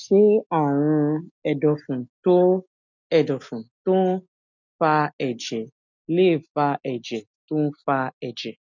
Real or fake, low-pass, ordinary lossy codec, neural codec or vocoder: real; 7.2 kHz; none; none